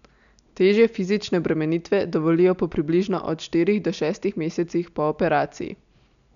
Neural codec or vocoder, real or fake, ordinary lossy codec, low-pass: none; real; none; 7.2 kHz